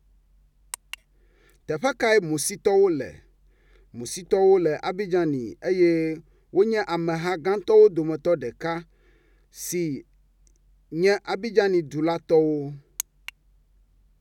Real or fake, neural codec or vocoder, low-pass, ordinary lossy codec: real; none; 19.8 kHz; none